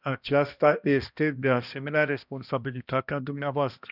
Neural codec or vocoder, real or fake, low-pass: codec, 16 kHz, 1 kbps, X-Codec, HuBERT features, trained on balanced general audio; fake; 5.4 kHz